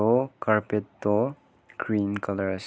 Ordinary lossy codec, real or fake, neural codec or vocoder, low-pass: none; real; none; none